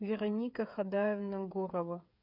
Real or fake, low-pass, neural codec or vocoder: fake; 5.4 kHz; codec, 16 kHz, 8 kbps, FreqCodec, smaller model